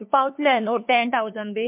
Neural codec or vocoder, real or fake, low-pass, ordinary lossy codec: codec, 16 kHz, 2 kbps, FunCodec, trained on LibriTTS, 25 frames a second; fake; 3.6 kHz; MP3, 32 kbps